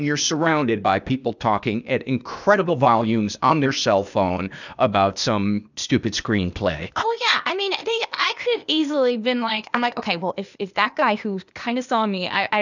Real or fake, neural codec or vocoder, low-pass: fake; codec, 16 kHz, 0.8 kbps, ZipCodec; 7.2 kHz